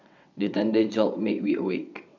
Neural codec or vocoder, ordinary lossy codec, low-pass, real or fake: vocoder, 22.05 kHz, 80 mel bands, WaveNeXt; none; 7.2 kHz; fake